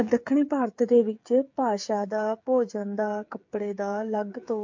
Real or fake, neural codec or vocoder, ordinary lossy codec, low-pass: fake; codec, 16 kHz, 8 kbps, FreqCodec, smaller model; MP3, 48 kbps; 7.2 kHz